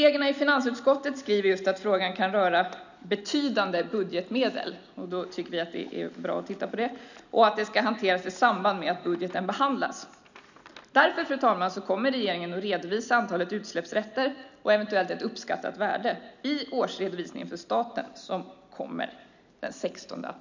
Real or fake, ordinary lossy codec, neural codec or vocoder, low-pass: real; none; none; 7.2 kHz